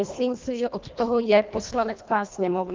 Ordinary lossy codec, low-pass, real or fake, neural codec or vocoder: Opus, 32 kbps; 7.2 kHz; fake; codec, 24 kHz, 1.5 kbps, HILCodec